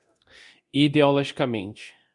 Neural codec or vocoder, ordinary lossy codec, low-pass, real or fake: codec, 24 kHz, 0.9 kbps, DualCodec; Opus, 64 kbps; 10.8 kHz; fake